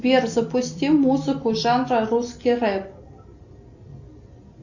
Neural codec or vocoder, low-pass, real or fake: none; 7.2 kHz; real